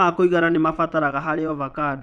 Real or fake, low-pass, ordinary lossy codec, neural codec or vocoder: fake; none; none; vocoder, 22.05 kHz, 80 mel bands, Vocos